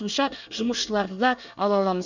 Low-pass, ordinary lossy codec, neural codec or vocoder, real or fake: 7.2 kHz; none; codec, 24 kHz, 1 kbps, SNAC; fake